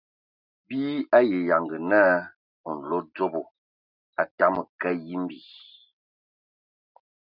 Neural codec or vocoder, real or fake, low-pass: none; real; 5.4 kHz